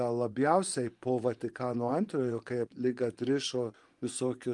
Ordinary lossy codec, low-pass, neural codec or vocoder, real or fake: Opus, 32 kbps; 10.8 kHz; vocoder, 24 kHz, 100 mel bands, Vocos; fake